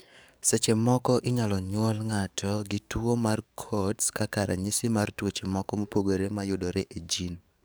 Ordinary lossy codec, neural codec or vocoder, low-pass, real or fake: none; codec, 44.1 kHz, 7.8 kbps, DAC; none; fake